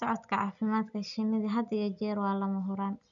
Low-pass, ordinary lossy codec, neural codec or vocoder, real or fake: 7.2 kHz; none; none; real